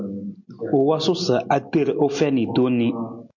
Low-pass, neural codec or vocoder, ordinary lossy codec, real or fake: 7.2 kHz; none; MP3, 48 kbps; real